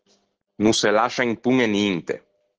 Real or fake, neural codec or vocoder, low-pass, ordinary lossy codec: real; none; 7.2 kHz; Opus, 16 kbps